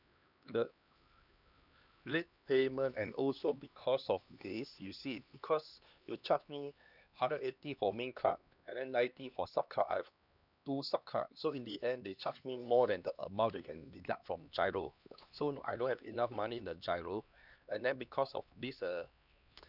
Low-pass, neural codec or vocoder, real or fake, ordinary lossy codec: 5.4 kHz; codec, 16 kHz, 2 kbps, X-Codec, HuBERT features, trained on LibriSpeech; fake; none